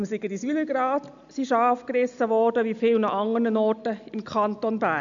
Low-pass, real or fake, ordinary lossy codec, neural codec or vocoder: 7.2 kHz; real; MP3, 96 kbps; none